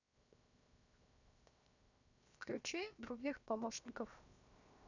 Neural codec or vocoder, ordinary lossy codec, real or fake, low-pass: codec, 16 kHz, 0.7 kbps, FocalCodec; none; fake; 7.2 kHz